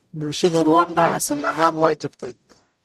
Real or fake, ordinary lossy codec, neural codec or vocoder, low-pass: fake; none; codec, 44.1 kHz, 0.9 kbps, DAC; 14.4 kHz